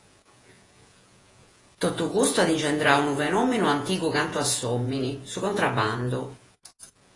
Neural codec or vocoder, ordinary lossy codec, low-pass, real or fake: vocoder, 48 kHz, 128 mel bands, Vocos; AAC, 32 kbps; 10.8 kHz; fake